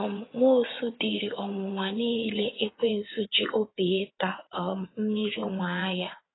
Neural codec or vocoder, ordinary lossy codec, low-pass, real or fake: vocoder, 22.05 kHz, 80 mel bands, WaveNeXt; AAC, 16 kbps; 7.2 kHz; fake